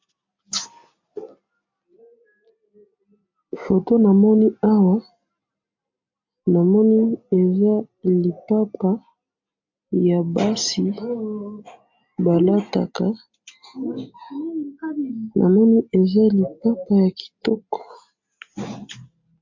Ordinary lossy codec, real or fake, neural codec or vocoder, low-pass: MP3, 48 kbps; real; none; 7.2 kHz